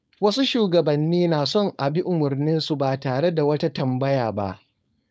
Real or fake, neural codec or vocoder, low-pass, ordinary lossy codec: fake; codec, 16 kHz, 4.8 kbps, FACodec; none; none